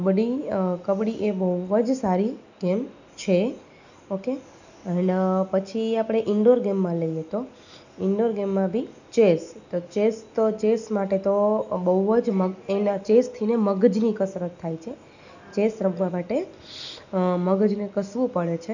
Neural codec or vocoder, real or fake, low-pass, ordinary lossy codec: none; real; 7.2 kHz; none